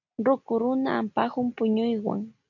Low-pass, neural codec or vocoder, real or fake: 7.2 kHz; none; real